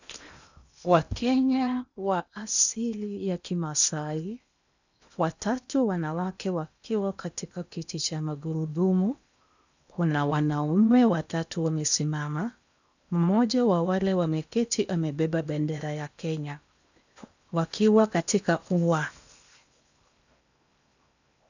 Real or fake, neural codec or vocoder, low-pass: fake; codec, 16 kHz in and 24 kHz out, 0.8 kbps, FocalCodec, streaming, 65536 codes; 7.2 kHz